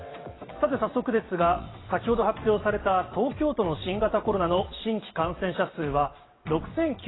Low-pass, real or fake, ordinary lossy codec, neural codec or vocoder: 7.2 kHz; fake; AAC, 16 kbps; vocoder, 22.05 kHz, 80 mel bands, WaveNeXt